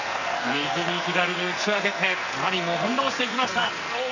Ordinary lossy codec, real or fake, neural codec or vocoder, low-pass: none; fake; codec, 44.1 kHz, 2.6 kbps, SNAC; 7.2 kHz